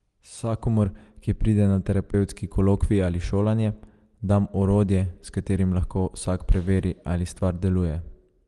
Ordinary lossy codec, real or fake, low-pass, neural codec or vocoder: Opus, 24 kbps; real; 10.8 kHz; none